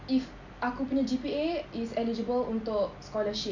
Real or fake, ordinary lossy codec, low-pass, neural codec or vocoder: real; none; 7.2 kHz; none